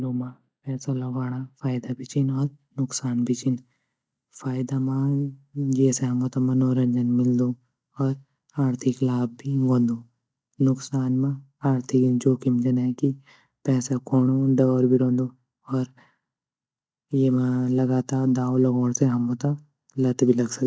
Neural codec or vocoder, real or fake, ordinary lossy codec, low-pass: none; real; none; none